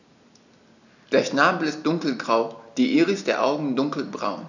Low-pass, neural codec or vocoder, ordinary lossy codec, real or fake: 7.2 kHz; none; none; real